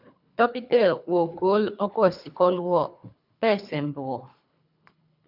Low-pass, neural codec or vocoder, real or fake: 5.4 kHz; codec, 24 kHz, 3 kbps, HILCodec; fake